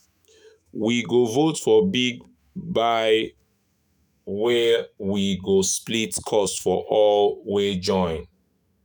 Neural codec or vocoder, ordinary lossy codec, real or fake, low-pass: autoencoder, 48 kHz, 128 numbers a frame, DAC-VAE, trained on Japanese speech; none; fake; none